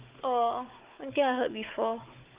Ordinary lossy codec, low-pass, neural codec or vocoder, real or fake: Opus, 24 kbps; 3.6 kHz; codec, 16 kHz, 4 kbps, FunCodec, trained on Chinese and English, 50 frames a second; fake